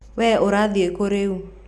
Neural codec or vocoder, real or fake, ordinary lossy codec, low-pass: none; real; none; none